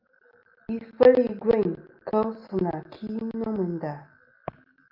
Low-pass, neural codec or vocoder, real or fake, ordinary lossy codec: 5.4 kHz; none; real; Opus, 24 kbps